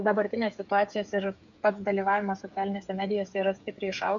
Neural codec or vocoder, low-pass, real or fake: codec, 16 kHz, 8 kbps, FreqCodec, smaller model; 7.2 kHz; fake